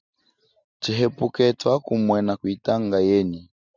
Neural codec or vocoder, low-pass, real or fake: none; 7.2 kHz; real